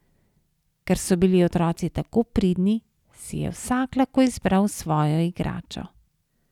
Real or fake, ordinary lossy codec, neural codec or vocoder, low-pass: real; none; none; 19.8 kHz